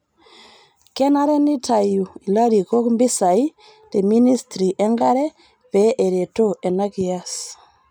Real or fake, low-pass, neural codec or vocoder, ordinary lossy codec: real; none; none; none